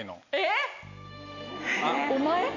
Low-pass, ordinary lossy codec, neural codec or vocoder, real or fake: 7.2 kHz; none; none; real